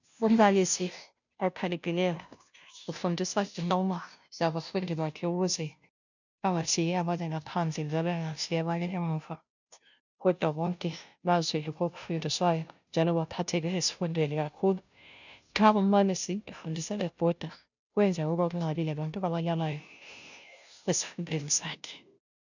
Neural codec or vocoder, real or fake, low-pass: codec, 16 kHz, 0.5 kbps, FunCodec, trained on Chinese and English, 25 frames a second; fake; 7.2 kHz